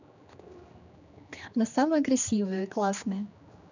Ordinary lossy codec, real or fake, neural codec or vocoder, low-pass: none; fake; codec, 16 kHz, 2 kbps, X-Codec, HuBERT features, trained on general audio; 7.2 kHz